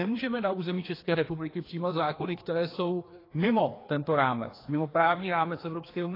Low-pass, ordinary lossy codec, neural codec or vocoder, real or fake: 5.4 kHz; AAC, 24 kbps; codec, 16 kHz, 2 kbps, FreqCodec, larger model; fake